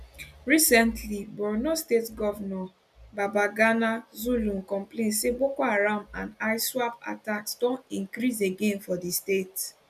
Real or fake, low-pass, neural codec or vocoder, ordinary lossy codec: real; 14.4 kHz; none; none